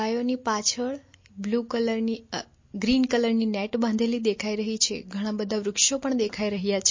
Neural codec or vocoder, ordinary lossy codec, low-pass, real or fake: none; MP3, 32 kbps; 7.2 kHz; real